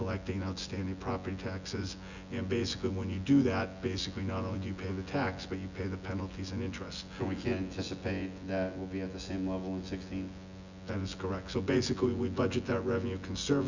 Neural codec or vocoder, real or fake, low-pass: vocoder, 24 kHz, 100 mel bands, Vocos; fake; 7.2 kHz